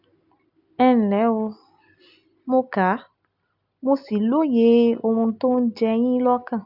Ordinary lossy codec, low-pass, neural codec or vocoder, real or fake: none; 5.4 kHz; none; real